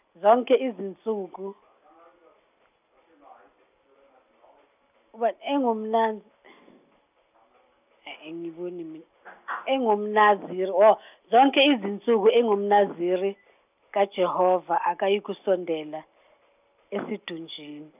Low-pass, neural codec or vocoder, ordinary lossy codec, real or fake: 3.6 kHz; none; none; real